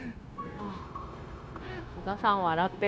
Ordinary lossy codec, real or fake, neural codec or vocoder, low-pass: none; fake; codec, 16 kHz, 0.9 kbps, LongCat-Audio-Codec; none